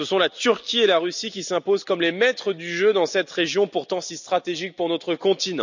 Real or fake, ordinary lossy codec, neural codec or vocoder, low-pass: real; none; none; 7.2 kHz